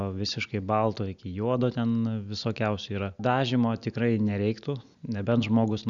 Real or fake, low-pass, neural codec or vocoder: real; 7.2 kHz; none